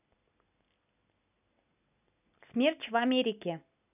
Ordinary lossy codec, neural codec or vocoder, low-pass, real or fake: none; none; 3.6 kHz; real